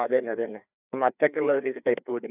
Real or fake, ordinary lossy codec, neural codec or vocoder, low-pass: fake; none; codec, 16 kHz, 2 kbps, FreqCodec, larger model; 3.6 kHz